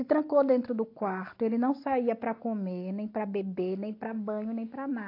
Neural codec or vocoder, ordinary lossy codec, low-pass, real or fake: none; AAC, 32 kbps; 5.4 kHz; real